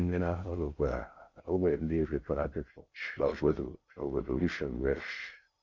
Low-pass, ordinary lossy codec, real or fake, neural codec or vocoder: 7.2 kHz; Opus, 64 kbps; fake; codec, 16 kHz in and 24 kHz out, 0.6 kbps, FocalCodec, streaming, 4096 codes